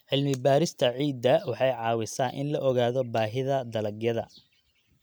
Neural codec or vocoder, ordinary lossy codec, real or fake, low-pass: none; none; real; none